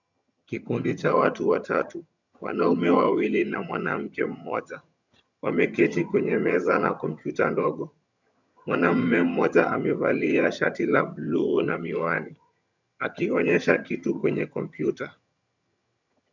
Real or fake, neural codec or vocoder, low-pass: fake; vocoder, 22.05 kHz, 80 mel bands, HiFi-GAN; 7.2 kHz